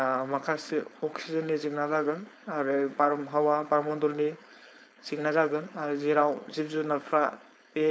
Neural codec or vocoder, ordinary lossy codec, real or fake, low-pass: codec, 16 kHz, 4.8 kbps, FACodec; none; fake; none